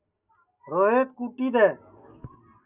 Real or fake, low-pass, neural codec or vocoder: real; 3.6 kHz; none